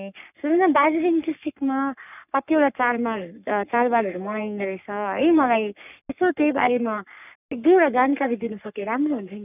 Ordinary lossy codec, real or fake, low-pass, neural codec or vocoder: none; fake; 3.6 kHz; codec, 44.1 kHz, 3.4 kbps, Pupu-Codec